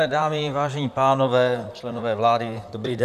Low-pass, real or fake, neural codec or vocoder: 14.4 kHz; fake; vocoder, 44.1 kHz, 128 mel bands, Pupu-Vocoder